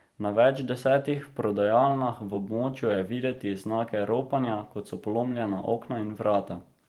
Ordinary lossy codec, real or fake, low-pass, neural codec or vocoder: Opus, 24 kbps; fake; 19.8 kHz; vocoder, 44.1 kHz, 128 mel bands every 512 samples, BigVGAN v2